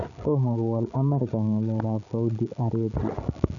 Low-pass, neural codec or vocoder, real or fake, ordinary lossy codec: 7.2 kHz; codec, 16 kHz, 16 kbps, FreqCodec, larger model; fake; none